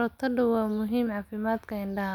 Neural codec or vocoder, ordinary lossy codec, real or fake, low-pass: none; none; real; 19.8 kHz